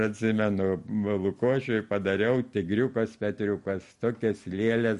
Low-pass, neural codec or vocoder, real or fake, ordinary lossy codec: 14.4 kHz; none; real; MP3, 48 kbps